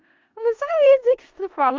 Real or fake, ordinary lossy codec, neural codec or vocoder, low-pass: fake; Opus, 32 kbps; codec, 16 kHz in and 24 kHz out, 0.4 kbps, LongCat-Audio-Codec, four codebook decoder; 7.2 kHz